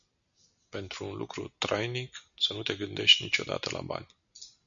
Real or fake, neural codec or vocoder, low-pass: real; none; 7.2 kHz